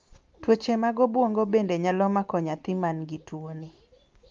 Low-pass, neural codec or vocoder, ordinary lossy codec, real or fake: 7.2 kHz; none; Opus, 32 kbps; real